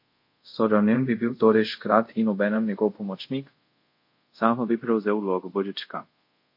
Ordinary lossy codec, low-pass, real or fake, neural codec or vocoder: MP3, 32 kbps; 5.4 kHz; fake; codec, 24 kHz, 0.5 kbps, DualCodec